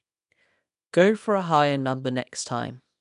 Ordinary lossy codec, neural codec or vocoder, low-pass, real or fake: none; codec, 24 kHz, 0.9 kbps, WavTokenizer, small release; 10.8 kHz; fake